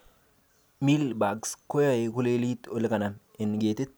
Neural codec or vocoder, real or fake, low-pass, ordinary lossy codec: none; real; none; none